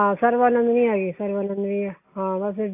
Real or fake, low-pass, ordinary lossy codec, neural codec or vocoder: real; 3.6 kHz; AAC, 24 kbps; none